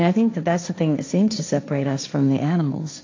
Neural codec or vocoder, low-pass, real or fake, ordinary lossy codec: codec, 16 kHz, 1.1 kbps, Voila-Tokenizer; 7.2 kHz; fake; AAC, 48 kbps